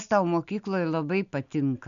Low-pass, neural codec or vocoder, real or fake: 7.2 kHz; none; real